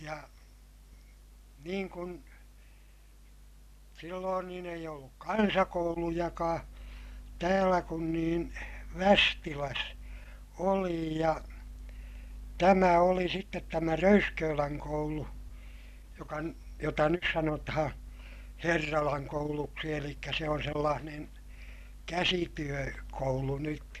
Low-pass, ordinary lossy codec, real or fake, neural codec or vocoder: 14.4 kHz; none; real; none